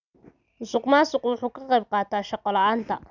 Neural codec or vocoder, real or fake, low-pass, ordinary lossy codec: none; real; 7.2 kHz; none